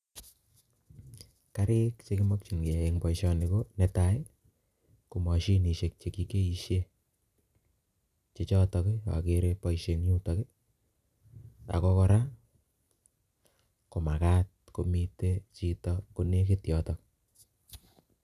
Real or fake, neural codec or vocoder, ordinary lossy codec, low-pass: real; none; none; 14.4 kHz